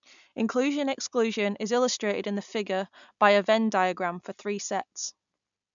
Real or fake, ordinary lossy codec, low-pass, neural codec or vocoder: real; none; 7.2 kHz; none